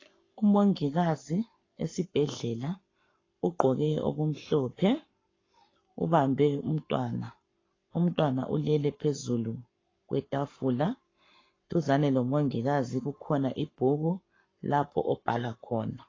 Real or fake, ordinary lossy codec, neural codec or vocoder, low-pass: fake; AAC, 32 kbps; codec, 44.1 kHz, 7.8 kbps, Pupu-Codec; 7.2 kHz